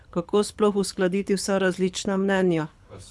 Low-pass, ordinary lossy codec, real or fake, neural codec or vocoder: none; none; fake; codec, 24 kHz, 6 kbps, HILCodec